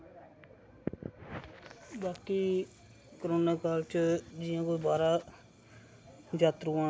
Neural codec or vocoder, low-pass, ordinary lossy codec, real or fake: none; none; none; real